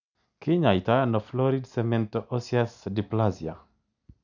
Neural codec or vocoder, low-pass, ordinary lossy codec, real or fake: none; 7.2 kHz; none; real